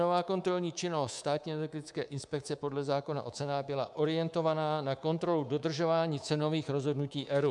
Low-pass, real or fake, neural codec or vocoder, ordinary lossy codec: 10.8 kHz; fake; codec, 24 kHz, 3.1 kbps, DualCodec; MP3, 96 kbps